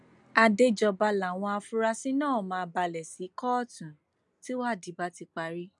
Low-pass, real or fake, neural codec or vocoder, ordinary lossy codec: 10.8 kHz; real; none; none